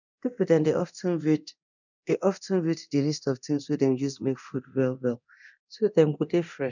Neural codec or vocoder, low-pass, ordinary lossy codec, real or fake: codec, 24 kHz, 0.9 kbps, DualCodec; 7.2 kHz; none; fake